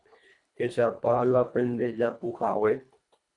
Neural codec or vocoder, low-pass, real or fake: codec, 24 kHz, 1.5 kbps, HILCodec; 10.8 kHz; fake